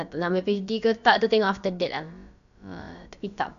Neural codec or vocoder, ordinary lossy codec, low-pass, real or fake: codec, 16 kHz, about 1 kbps, DyCAST, with the encoder's durations; none; 7.2 kHz; fake